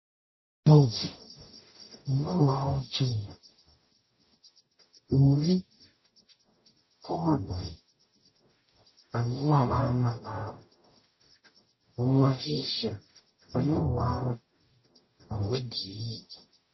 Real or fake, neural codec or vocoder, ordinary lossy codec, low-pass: fake; codec, 44.1 kHz, 0.9 kbps, DAC; MP3, 24 kbps; 7.2 kHz